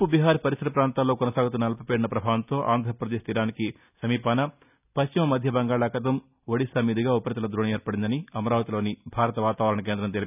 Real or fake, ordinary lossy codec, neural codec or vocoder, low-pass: real; none; none; 3.6 kHz